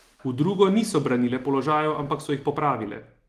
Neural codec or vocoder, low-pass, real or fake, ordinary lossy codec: none; 14.4 kHz; real; Opus, 24 kbps